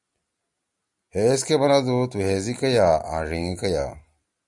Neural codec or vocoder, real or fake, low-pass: none; real; 10.8 kHz